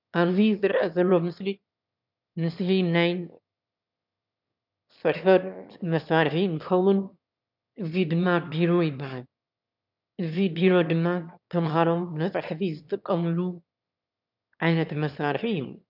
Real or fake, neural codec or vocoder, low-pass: fake; autoencoder, 22.05 kHz, a latent of 192 numbers a frame, VITS, trained on one speaker; 5.4 kHz